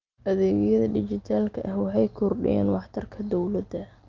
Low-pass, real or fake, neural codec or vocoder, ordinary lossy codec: 7.2 kHz; real; none; Opus, 32 kbps